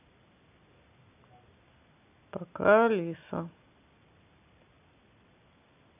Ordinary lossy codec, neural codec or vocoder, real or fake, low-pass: none; none; real; 3.6 kHz